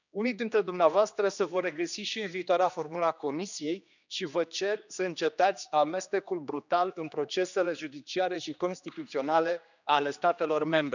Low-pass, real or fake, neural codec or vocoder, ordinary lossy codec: 7.2 kHz; fake; codec, 16 kHz, 2 kbps, X-Codec, HuBERT features, trained on general audio; none